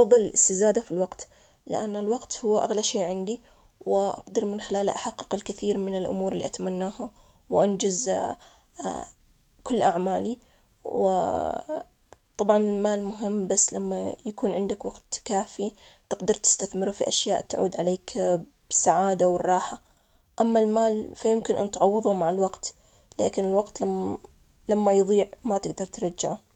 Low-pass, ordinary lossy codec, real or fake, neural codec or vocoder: 19.8 kHz; none; fake; codec, 44.1 kHz, 7.8 kbps, DAC